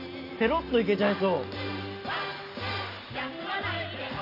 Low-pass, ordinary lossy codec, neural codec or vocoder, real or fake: 5.4 kHz; AAC, 32 kbps; none; real